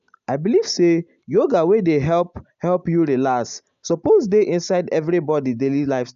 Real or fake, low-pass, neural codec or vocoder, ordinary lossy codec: real; 7.2 kHz; none; AAC, 96 kbps